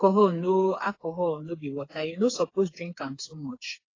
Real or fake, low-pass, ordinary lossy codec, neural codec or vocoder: fake; 7.2 kHz; AAC, 32 kbps; codec, 16 kHz, 4 kbps, FreqCodec, smaller model